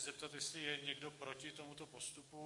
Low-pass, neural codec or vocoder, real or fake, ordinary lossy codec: 10.8 kHz; codec, 44.1 kHz, 7.8 kbps, DAC; fake; MP3, 48 kbps